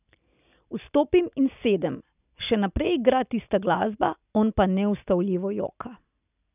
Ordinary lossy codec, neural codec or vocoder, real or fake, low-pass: none; none; real; 3.6 kHz